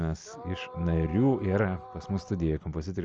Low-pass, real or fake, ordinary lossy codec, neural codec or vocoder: 7.2 kHz; real; Opus, 24 kbps; none